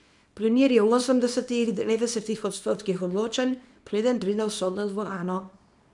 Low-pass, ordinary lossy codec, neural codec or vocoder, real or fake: 10.8 kHz; none; codec, 24 kHz, 0.9 kbps, WavTokenizer, small release; fake